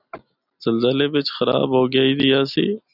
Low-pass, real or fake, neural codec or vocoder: 5.4 kHz; real; none